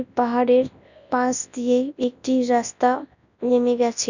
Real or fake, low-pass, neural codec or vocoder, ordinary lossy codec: fake; 7.2 kHz; codec, 24 kHz, 0.9 kbps, WavTokenizer, large speech release; none